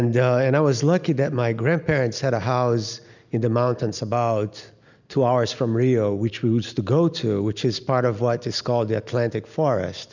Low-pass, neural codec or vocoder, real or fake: 7.2 kHz; none; real